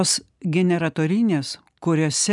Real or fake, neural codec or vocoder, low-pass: real; none; 10.8 kHz